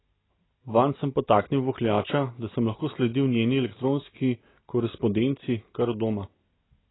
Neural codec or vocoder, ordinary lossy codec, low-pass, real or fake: none; AAC, 16 kbps; 7.2 kHz; real